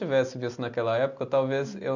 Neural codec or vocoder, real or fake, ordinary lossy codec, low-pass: none; real; none; 7.2 kHz